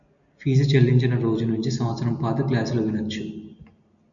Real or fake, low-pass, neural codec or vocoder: real; 7.2 kHz; none